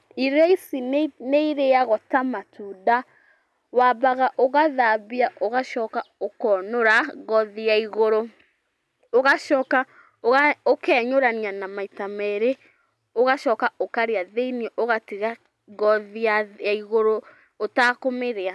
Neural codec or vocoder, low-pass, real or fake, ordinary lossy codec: none; none; real; none